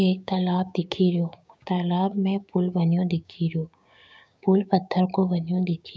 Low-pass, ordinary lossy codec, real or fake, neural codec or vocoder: none; none; fake; codec, 16 kHz, 6 kbps, DAC